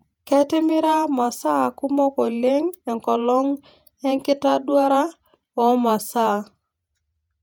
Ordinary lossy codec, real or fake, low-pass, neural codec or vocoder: none; fake; 19.8 kHz; vocoder, 48 kHz, 128 mel bands, Vocos